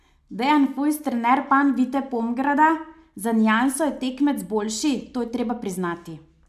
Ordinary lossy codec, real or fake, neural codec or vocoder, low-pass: AAC, 96 kbps; real; none; 14.4 kHz